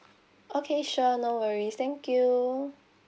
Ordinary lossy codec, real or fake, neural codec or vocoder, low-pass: none; real; none; none